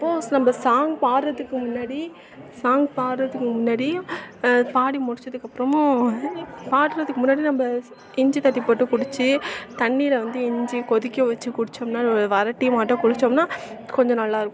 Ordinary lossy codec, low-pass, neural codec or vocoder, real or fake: none; none; none; real